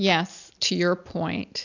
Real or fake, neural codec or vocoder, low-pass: real; none; 7.2 kHz